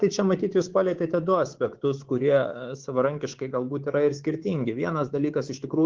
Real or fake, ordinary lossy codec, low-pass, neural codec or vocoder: fake; Opus, 16 kbps; 7.2 kHz; codec, 24 kHz, 3.1 kbps, DualCodec